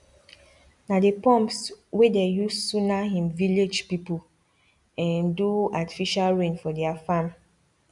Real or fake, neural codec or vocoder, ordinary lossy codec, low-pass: real; none; none; 10.8 kHz